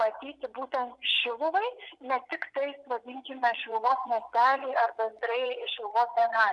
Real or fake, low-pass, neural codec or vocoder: fake; 10.8 kHz; vocoder, 24 kHz, 100 mel bands, Vocos